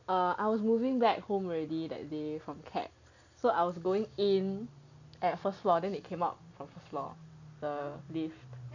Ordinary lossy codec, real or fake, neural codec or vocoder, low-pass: none; real; none; 7.2 kHz